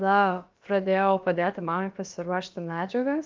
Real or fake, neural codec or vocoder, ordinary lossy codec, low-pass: fake; codec, 16 kHz, about 1 kbps, DyCAST, with the encoder's durations; Opus, 32 kbps; 7.2 kHz